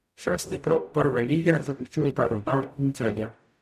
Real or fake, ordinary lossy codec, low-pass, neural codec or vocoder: fake; none; 14.4 kHz; codec, 44.1 kHz, 0.9 kbps, DAC